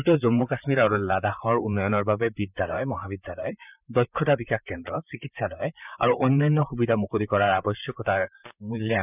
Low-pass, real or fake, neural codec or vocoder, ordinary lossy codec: 3.6 kHz; fake; vocoder, 44.1 kHz, 128 mel bands, Pupu-Vocoder; none